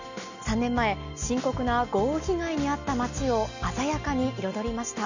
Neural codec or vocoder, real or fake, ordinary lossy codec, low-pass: none; real; none; 7.2 kHz